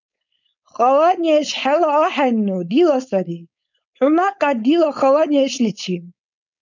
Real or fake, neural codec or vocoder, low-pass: fake; codec, 16 kHz, 4.8 kbps, FACodec; 7.2 kHz